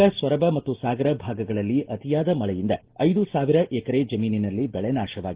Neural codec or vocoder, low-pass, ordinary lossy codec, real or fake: none; 3.6 kHz; Opus, 16 kbps; real